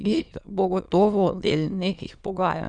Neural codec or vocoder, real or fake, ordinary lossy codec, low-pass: autoencoder, 22.05 kHz, a latent of 192 numbers a frame, VITS, trained on many speakers; fake; none; 9.9 kHz